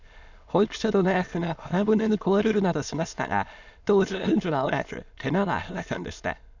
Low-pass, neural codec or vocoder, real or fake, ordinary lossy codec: 7.2 kHz; autoencoder, 22.05 kHz, a latent of 192 numbers a frame, VITS, trained on many speakers; fake; none